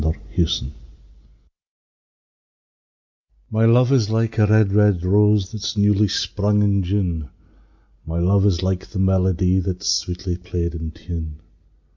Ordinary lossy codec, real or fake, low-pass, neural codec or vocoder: MP3, 64 kbps; real; 7.2 kHz; none